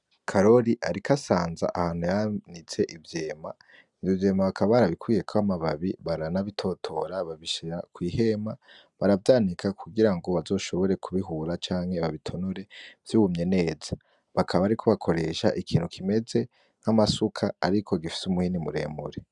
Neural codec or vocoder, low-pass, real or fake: none; 10.8 kHz; real